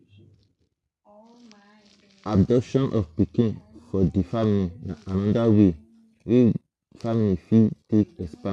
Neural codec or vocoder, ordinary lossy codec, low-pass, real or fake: none; none; none; real